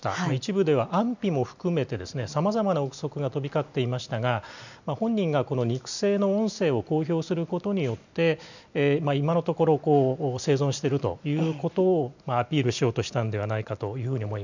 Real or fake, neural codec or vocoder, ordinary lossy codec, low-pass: real; none; none; 7.2 kHz